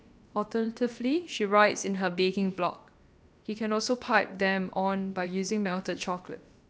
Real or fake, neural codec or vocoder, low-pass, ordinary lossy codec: fake; codec, 16 kHz, about 1 kbps, DyCAST, with the encoder's durations; none; none